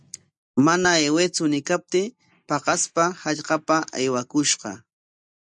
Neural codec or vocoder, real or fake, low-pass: none; real; 10.8 kHz